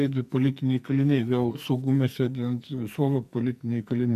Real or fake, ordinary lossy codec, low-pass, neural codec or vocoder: fake; MP3, 96 kbps; 14.4 kHz; codec, 44.1 kHz, 2.6 kbps, SNAC